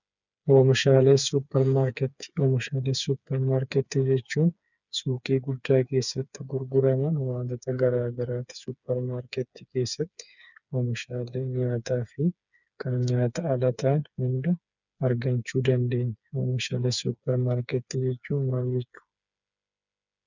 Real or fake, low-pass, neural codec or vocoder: fake; 7.2 kHz; codec, 16 kHz, 4 kbps, FreqCodec, smaller model